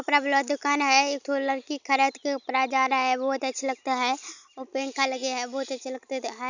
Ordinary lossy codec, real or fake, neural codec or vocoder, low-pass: none; real; none; 7.2 kHz